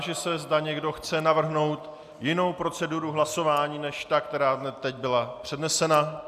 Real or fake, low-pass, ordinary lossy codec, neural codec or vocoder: real; 14.4 kHz; AAC, 96 kbps; none